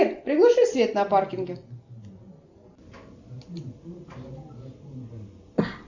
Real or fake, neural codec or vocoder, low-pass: real; none; 7.2 kHz